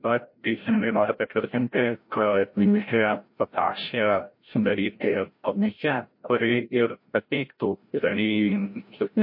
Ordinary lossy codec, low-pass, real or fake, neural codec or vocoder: MP3, 32 kbps; 5.4 kHz; fake; codec, 16 kHz, 0.5 kbps, FreqCodec, larger model